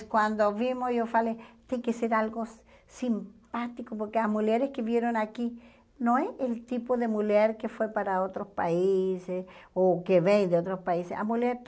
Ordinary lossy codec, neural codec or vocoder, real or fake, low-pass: none; none; real; none